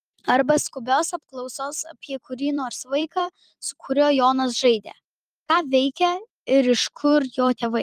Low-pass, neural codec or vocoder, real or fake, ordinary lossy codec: 14.4 kHz; vocoder, 44.1 kHz, 128 mel bands every 256 samples, BigVGAN v2; fake; Opus, 32 kbps